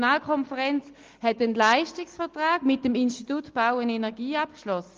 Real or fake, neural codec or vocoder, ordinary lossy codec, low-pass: real; none; Opus, 24 kbps; 7.2 kHz